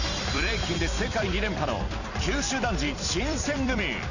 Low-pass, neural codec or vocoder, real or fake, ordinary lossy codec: 7.2 kHz; vocoder, 44.1 kHz, 128 mel bands every 256 samples, BigVGAN v2; fake; none